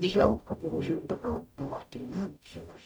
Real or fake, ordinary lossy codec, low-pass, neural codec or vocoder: fake; none; none; codec, 44.1 kHz, 0.9 kbps, DAC